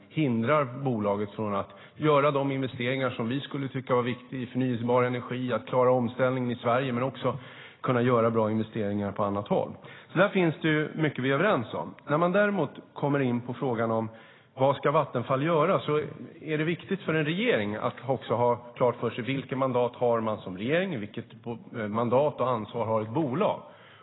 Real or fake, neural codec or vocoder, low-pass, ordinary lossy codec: real; none; 7.2 kHz; AAC, 16 kbps